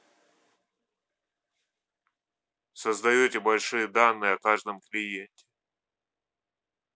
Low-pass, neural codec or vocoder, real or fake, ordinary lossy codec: none; none; real; none